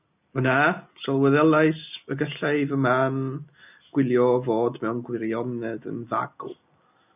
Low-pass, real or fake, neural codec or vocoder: 3.6 kHz; real; none